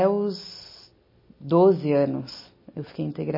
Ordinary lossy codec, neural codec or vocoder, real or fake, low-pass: MP3, 24 kbps; none; real; 5.4 kHz